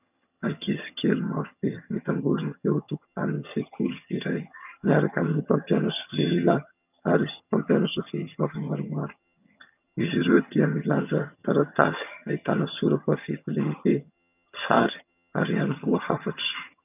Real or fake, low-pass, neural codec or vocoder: fake; 3.6 kHz; vocoder, 22.05 kHz, 80 mel bands, HiFi-GAN